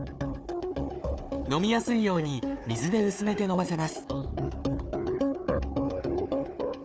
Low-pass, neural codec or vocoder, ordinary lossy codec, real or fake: none; codec, 16 kHz, 4 kbps, FunCodec, trained on Chinese and English, 50 frames a second; none; fake